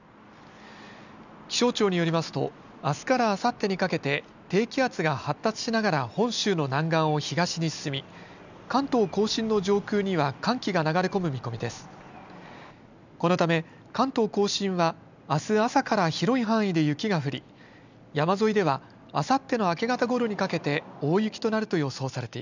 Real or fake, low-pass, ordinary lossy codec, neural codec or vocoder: real; 7.2 kHz; none; none